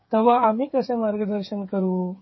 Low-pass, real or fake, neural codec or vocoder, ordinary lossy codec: 7.2 kHz; fake; codec, 16 kHz, 8 kbps, FreqCodec, smaller model; MP3, 24 kbps